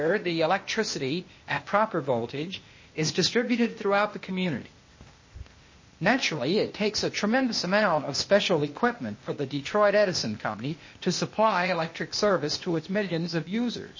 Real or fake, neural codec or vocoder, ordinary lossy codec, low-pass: fake; codec, 16 kHz, 0.8 kbps, ZipCodec; MP3, 32 kbps; 7.2 kHz